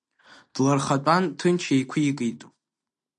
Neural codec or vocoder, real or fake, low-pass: none; real; 10.8 kHz